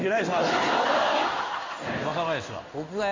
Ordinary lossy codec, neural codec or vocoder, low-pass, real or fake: MP3, 32 kbps; codec, 16 kHz in and 24 kHz out, 1 kbps, XY-Tokenizer; 7.2 kHz; fake